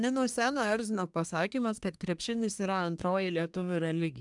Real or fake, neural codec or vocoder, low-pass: fake; codec, 24 kHz, 1 kbps, SNAC; 10.8 kHz